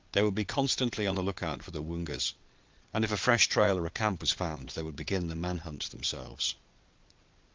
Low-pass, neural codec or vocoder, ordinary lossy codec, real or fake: 7.2 kHz; vocoder, 22.05 kHz, 80 mel bands, Vocos; Opus, 24 kbps; fake